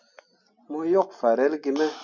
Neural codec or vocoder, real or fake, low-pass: none; real; 7.2 kHz